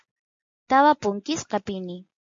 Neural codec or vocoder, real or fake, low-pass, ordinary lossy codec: none; real; 7.2 kHz; MP3, 48 kbps